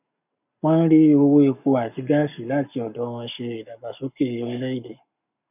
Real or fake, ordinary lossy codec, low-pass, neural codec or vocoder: fake; none; 3.6 kHz; codec, 44.1 kHz, 7.8 kbps, Pupu-Codec